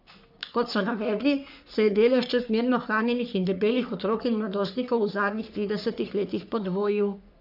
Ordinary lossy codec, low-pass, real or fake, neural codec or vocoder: none; 5.4 kHz; fake; codec, 44.1 kHz, 3.4 kbps, Pupu-Codec